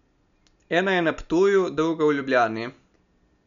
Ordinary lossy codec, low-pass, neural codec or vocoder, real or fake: none; 7.2 kHz; none; real